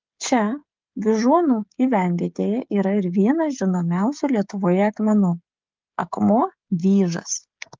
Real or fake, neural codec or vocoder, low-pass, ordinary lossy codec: fake; codec, 16 kHz, 16 kbps, FreqCodec, smaller model; 7.2 kHz; Opus, 32 kbps